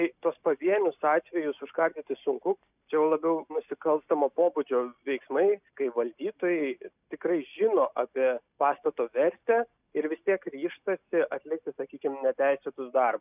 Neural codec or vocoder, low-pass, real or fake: none; 3.6 kHz; real